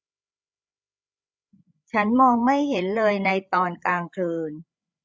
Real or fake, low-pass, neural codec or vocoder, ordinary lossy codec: fake; none; codec, 16 kHz, 8 kbps, FreqCodec, larger model; none